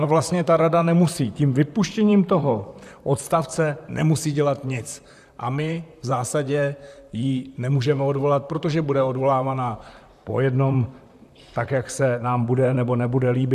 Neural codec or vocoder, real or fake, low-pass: vocoder, 44.1 kHz, 128 mel bands, Pupu-Vocoder; fake; 14.4 kHz